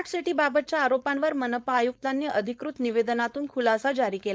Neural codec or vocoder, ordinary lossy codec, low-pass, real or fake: codec, 16 kHz, 4.8 kbps, FACodec; none; none; fake